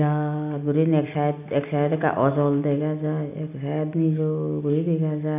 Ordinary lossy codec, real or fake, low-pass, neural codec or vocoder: none; real; 3.6 kHz; none